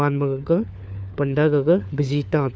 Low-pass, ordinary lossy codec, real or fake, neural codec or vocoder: none; none; fake; codec, 16 kHz, 4 kbps, FunCodec, trained on LibriTTS, 50 frames a second